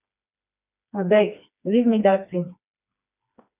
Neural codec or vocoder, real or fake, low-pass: codec, 16 kHz, 2 kbps, FreqCodec, smaller model; fake; 3.6 kHz